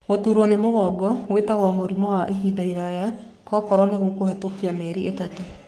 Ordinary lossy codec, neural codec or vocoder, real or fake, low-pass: Opus, 32 kbps; codec, 44.1 kHz, 3.4 kbps, Pupu-Codec; fake; 14.4 kHz